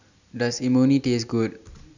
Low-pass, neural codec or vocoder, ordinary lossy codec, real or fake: 7.2 kHz; none; none; real